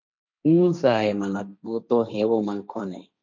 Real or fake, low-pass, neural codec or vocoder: fake; 7.2 kHz; codec, 16 kHz, 1.1 kbps, Voila-Tokenizer